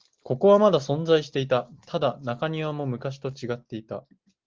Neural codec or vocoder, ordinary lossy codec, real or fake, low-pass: none; Opus, 16 kbps; real; 7.2 kHz